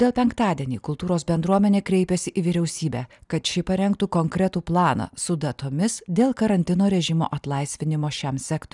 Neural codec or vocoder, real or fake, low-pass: none; real; 10.8 kHz